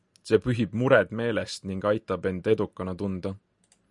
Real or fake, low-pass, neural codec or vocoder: real; 10.8 kHz; none